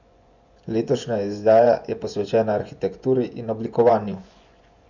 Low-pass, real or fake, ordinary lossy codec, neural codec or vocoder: 7.2 kHz; fake; none; vocoder, 24 kHz, 100 mel bands, Vocos